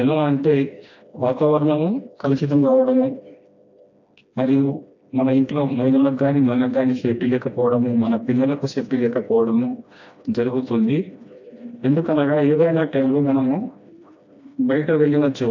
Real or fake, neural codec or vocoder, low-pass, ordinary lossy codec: fake; codec, 16 kHz, 1 kbps, FreqCodec, smaller model; 7.2 kHz; AAC, 48 kbps